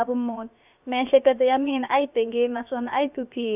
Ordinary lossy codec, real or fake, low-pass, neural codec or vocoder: none; fake; 3.6 kHz; codec, 16 kHz, about 1 kbps, DyCAST, with the encoder's durations